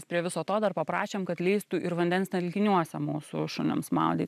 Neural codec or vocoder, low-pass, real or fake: none; 14.4 kHz; real